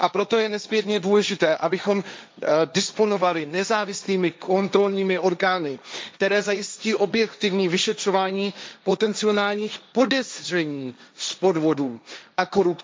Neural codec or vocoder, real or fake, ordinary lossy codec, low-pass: codec, 16 kHz, 1.1 kbps, Voila-Tokenizer; fake; none; none